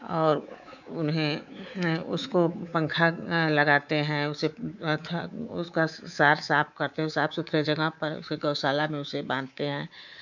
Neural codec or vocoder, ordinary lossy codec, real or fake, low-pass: codec, 24 kHz, 3.1 kbps, DualCodec; none; fake; 7.2 kHz